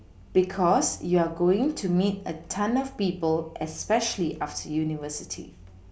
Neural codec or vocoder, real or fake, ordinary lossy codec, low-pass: none; real; none; none